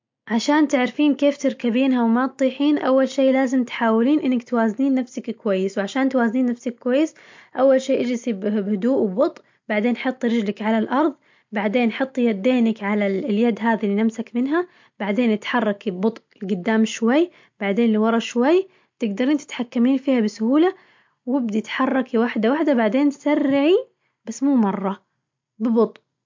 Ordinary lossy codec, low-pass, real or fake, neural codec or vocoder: MP3, 48 kbps; 7.2 kHz; real; none